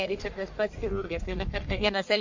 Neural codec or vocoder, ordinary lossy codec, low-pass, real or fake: codec, 44.1 kHz, 1.7 kbps, Pupu-Codec; MP3, 48 kbps; 7.2 kHz; fake